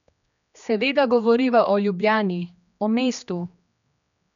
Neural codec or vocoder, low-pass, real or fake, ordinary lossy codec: codec, 16 kHz, 2 kbps, X-Codec, HuBERT features, trained on general audio; 7.2 kHz; fake; none